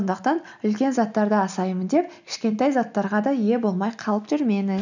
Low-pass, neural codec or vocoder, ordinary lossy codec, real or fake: 7.2 kHz; none; none; real